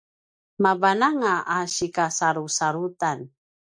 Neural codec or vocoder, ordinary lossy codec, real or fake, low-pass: none; MP3, 96 kbps; real; 9.9 kHz